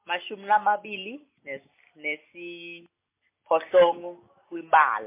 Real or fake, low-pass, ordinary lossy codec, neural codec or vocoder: real; 3.6 kHz; MP3, 24 kbps; none